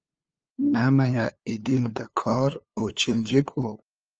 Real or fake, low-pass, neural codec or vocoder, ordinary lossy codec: fake; 7.2 kHz; codec, 16 kHz, 2 kbps, FunCodec, trained on LibriTTS, 25 frames a second; Opus, 24 kbps